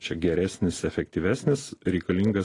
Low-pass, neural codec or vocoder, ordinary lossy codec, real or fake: 10.8 kHz; none; AAC, 32 kbps; real